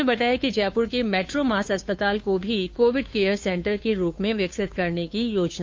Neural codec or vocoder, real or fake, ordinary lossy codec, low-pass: codec, 16 kHz, 2 kbps, FunCodec, trained on Chinese and English, 25 frames a second; fake; none; none